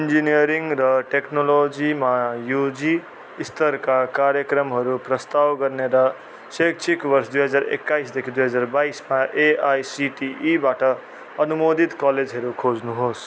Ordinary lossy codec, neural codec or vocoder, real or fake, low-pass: none; none; real; none